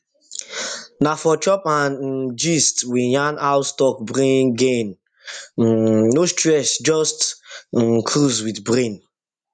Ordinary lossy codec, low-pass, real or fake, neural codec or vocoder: none; 9.9 kHz; real; none